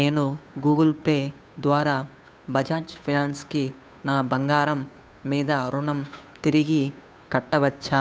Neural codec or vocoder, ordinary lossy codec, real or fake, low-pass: codec, 16 kHz, 2 kbps, FunCodec, trained on Chinese and English, 25 frames a second; none; fake; none